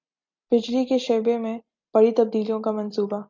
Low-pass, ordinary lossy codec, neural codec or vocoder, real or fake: 7.2 kHz; MP3, 64 kbps; none; real